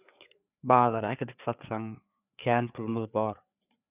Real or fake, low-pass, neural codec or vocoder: fake; 3.6 kHz; codec, 16 kHz, 4 kbps, FreqCodec, larger model